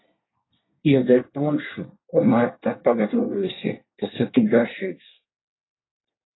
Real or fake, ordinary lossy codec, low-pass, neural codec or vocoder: fake; AAC, 16 kbps; 7.2 kHz; codec, 24 kHz, 1 kbps, SNAC